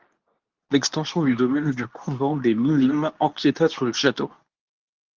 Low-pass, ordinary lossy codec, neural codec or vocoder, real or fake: 7.2 kHz; Opus, 32 kbps; codec, 24 kHz, 0.9 kbps, WavTokenizer, medium speech release version 2; fake